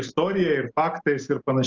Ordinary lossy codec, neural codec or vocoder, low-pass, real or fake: Opus, 24 kbps; none; 7.2 kHz; real